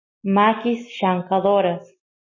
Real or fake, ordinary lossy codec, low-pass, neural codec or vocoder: real; MP3, 32 kbps; 7.2 kHz; none